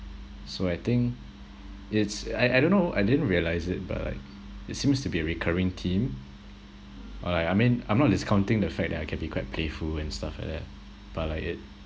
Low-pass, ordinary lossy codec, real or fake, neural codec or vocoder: none; none; real; none